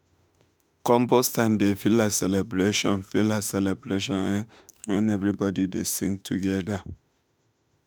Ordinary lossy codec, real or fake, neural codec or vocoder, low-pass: none; fake; autoencoder, 48 kHz, 32 numbers a frame, DAC-VAE, trained on Japanese speech; none